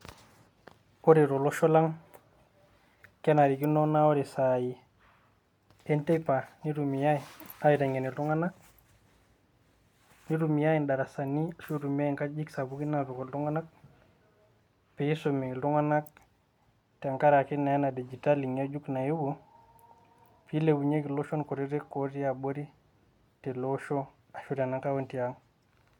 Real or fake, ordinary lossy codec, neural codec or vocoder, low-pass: real; MP3, 96 kbps; none; 19.8 kHz